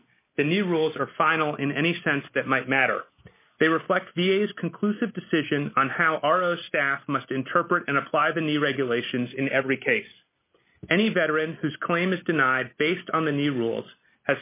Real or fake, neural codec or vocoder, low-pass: real; none; 3.6 kHz